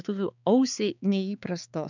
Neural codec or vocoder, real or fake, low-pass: codec, 16 kHz, 4 kbps, X-Codec, HuBERT features, trained on balanced general audio; fake; 7.2 kHz